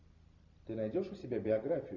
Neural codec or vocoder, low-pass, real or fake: none; 7.2 kHz; real